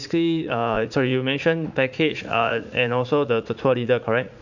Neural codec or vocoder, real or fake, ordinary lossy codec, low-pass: vocoder, 44.1 kHz, 80 mel bands, Vocos; fake; none; 7.2 kHz